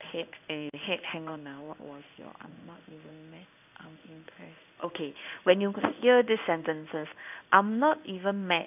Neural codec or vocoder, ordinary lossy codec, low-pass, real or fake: codec, 16 kHz in and 24 kHz out, 1 kbps, XY-Tokenizer; none; 3.6 kHz; fake